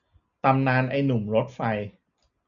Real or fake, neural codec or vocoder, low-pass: real; none; 7.2 kHz